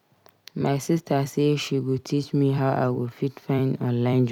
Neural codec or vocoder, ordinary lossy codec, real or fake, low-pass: vocoder, 44.1 kHz, 128 mel bands every 256 samples, BigVGAN v2; none; fake; 19.8 kHz